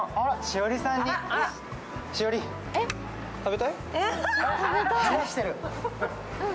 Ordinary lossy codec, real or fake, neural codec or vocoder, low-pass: none; real; none; none